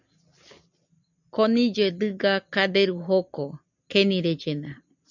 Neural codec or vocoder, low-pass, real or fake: none; 7.2 kHz; real